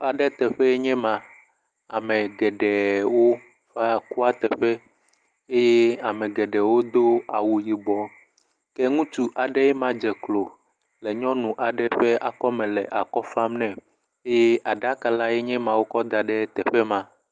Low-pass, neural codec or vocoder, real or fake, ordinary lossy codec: 9.9 kHz; none; real; Opus, 32 kbps